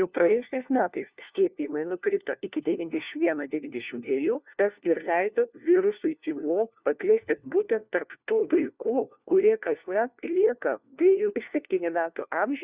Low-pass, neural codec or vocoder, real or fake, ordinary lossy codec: 3.6 kHz; codec, 16 kHz, 1 kbps, FunCodec, trained on LibriTTS, 50 frames a second; fake; Opus, 64 kbps